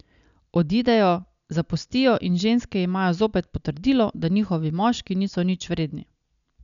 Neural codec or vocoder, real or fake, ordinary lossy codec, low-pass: none; real; none; 7.2 kHz